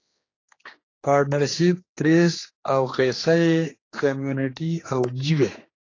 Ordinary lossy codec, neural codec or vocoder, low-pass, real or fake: AAC, 32 kbps; codec, 16 kHz, 2 kbps, X-Codec, HuBERT features, trained on general audio; 7.2 kHz; fake